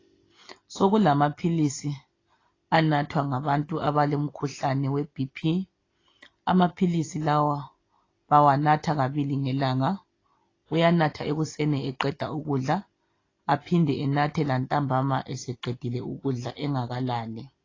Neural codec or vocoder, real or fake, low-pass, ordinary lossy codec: none; real; 7.2 kHz; AAC, 32 kbps